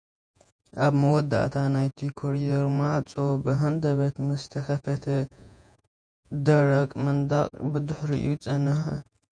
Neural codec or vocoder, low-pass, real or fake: vocoder, 48 kHz, 128 mel bands, Vocos; 9.9 kHz; fake